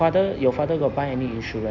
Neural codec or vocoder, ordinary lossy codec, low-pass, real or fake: none; none; 7.2 kHz; real